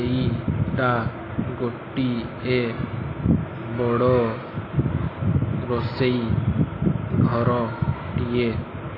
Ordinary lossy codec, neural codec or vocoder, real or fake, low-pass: AAC, 24 kbps; none; real; 5.4 kHz